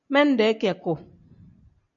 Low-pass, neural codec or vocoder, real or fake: 7.2 kHz; none; real